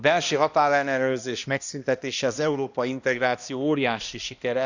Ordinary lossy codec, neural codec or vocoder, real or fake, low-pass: none; codec, 16 kHz, 1 kbps, X-Codec, HuBERT features, trained on balanced general audio; fake; 7.2 kHz